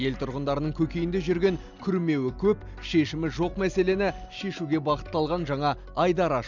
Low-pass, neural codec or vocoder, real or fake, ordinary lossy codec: 7.2 kHz; none; real; none